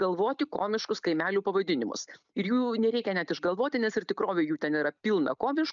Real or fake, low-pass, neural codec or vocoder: real; 7.2 kHz; none